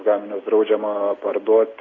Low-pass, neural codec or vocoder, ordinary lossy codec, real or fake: 7.2 kHz; none; AAC, 32 kbps; real